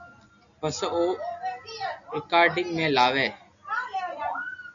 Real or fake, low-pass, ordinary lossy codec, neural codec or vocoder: real; 7.2 kHz; MP3, 96 kbps; none